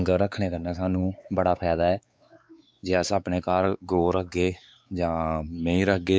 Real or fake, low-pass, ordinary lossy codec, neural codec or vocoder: fake; none; none; codec, 16 kHz, 4 kbps, X-Codec, WavLM features, trained on Multilingual LibriSpeech